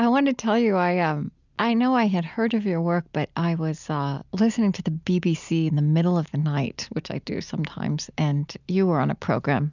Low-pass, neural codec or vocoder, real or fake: 7.2 kHz; none; real